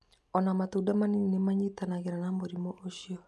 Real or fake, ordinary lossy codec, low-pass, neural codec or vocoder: real; none; none; none